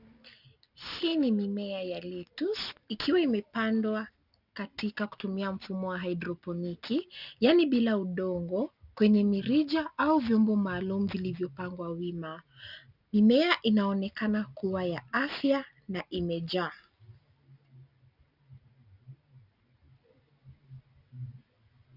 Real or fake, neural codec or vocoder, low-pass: real; none; 5.4 kHz